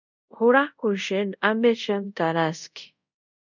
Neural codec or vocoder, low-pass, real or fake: codec, 24 kHz, 0.5 kbps, DualCodec; 7.2 kHz; fake